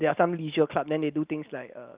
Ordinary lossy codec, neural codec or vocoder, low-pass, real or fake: Opus, 32 kbps; none; 3.6 kHz; real